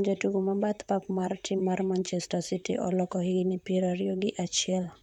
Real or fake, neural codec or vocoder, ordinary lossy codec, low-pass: fake; vocoder, 44.1 kHz, 128 mel bands, Pupu-Vocoder; none; 19.8 kHz